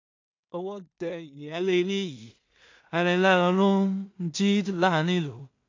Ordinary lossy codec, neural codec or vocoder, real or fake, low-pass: AAC, 48 kbps; codec, 16 kHz in and 24 kHz out, 0.4 kbps, LongCat-Audio-Codec, two codebook decoder; fake; 7.2 kHz